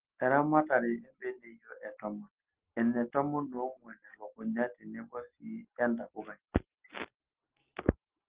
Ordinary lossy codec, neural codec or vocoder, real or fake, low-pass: Opus, 16 kbps; none; real; 3.6 kHz